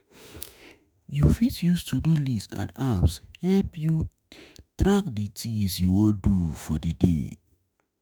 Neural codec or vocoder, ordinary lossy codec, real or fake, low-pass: autoencoder, 48 kHz, 32 numbers a frame, DAC-VAE, trained on Japanese speech; none; fake; none